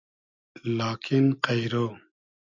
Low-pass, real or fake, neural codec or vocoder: 7.2 kHz; real; none